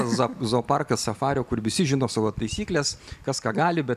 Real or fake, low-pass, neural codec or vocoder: real; 14.4 kHz; none